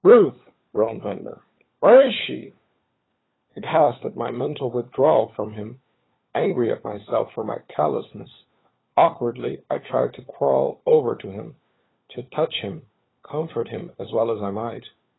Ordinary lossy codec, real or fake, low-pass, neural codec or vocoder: AAC, 16 kbps; fake; 7.2 kHz; codec, 16 kHz, 4 kbps, FunCodec, trained on LibriTTS, 50 frames a second